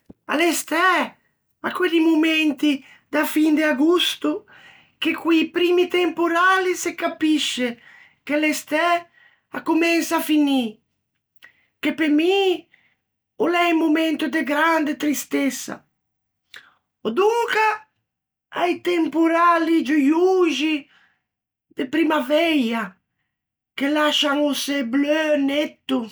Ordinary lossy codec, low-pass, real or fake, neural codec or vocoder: none; none; real; none